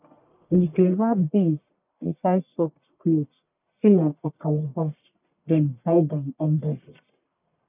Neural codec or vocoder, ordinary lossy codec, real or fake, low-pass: codec, 44.1 kHz, 1.7 kbps, Pupu-Codec; none; fake; 3.6 kHz